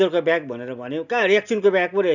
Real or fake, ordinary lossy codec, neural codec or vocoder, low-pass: real; none; none; 7.2 kHz